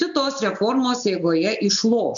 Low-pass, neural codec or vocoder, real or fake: 7.2 kHz; none; real